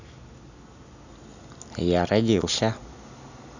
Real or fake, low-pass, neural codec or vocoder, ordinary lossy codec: real; 7.2 kHz; none; none